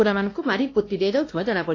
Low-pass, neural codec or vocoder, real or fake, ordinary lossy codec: 7.2 kHz; codec, 16 kHz, 1 kbps, X-Codec, WavLM features, trained on Multilingual LibriSpeech; fake; AAC, 32 kbps